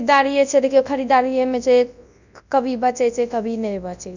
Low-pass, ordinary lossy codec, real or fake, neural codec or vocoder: 7.2 kHz; none; fake; codec, 24 kHz, 0.9 kbps, WavTokenizer, large speech release